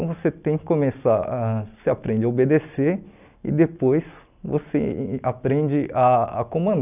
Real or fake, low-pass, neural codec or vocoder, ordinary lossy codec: real; 3.6 kHz; none; none